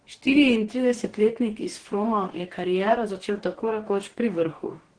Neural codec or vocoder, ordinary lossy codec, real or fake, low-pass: codec, 44.1 kHz, 2.6 kbps, DAC; Opus, 16 kbps; fake; 9.9 kHz